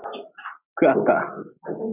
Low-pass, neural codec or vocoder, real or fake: 3.6 kHz; none; real